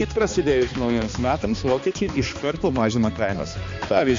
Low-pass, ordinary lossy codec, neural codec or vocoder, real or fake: 7.2 kHz; MP3, 48 kbps; codec, 16 kHz, 2 kbps, X-Codec, HuBERT features, trained on balanced general audio; fake